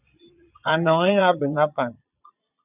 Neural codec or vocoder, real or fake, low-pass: vocoder, 22.05 kHz, 80 mel bands, Vocos; fake; 3.6 kHz